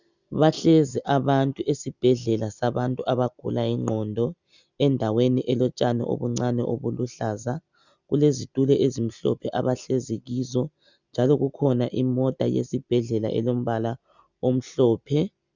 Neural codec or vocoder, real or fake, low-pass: none; real; 7.2 kHz